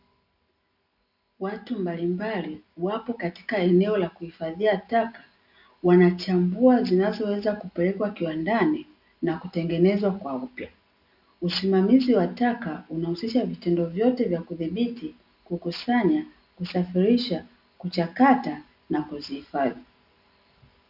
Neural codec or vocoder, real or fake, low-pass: none; real; 5.4 kHz